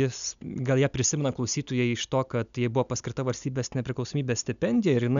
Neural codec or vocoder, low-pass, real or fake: none; 7.2 kHz; real